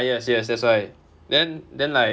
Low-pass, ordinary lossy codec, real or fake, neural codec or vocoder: none; none; real; none